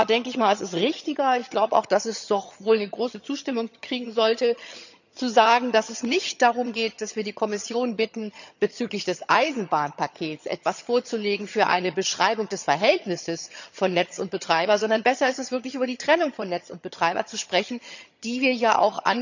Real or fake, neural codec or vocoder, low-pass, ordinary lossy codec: fake; vocoder, 22.05 kHz, 80 mel bands, HiFi-GAN; 7.2 kHz; none